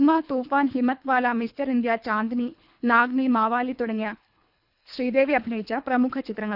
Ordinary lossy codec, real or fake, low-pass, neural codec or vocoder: none; fake; 5.4 kHz; codec, 24 kHz, 3 kbps, HILCodec